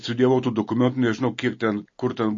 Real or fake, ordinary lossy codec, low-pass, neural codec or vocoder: real; MP3, 32 kbps; 7.2 kHz; none